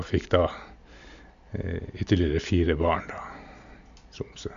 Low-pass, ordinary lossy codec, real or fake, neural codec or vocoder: 7.2 kHz; MP3, 64 kbps; real; none